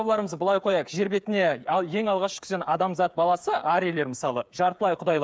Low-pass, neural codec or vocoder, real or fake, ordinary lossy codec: none; codec, 16 kHz, 8 kbps, FreqCodec, smaller model; fake; none